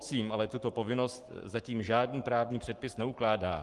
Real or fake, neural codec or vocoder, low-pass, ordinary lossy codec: fake; codec, 44.1 kHz, 7.8 kbps, Pupu-Codec; 10.8 kHz; Opus, 24 kbps